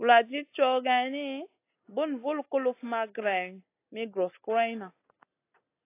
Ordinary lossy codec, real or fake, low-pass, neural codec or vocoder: AAC, 24 kbps; real; 3.6 kHz; none